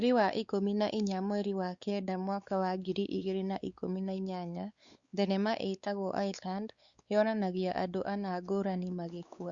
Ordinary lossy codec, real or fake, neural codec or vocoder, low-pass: Opus, 64 kbps; fake; codec, 16 kHz, 4 kbps, X-Codec, WavLM features, trained on Multilingual LibriSpeech; 7.2 kHz